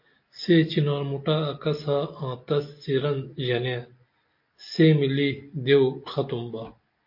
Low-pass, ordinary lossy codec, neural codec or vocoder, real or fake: 5.4 kHz; MP3, 32 kbps; none; real